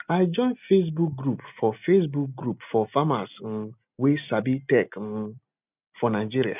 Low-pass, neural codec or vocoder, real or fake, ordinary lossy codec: 3.6 kHz; none; real; none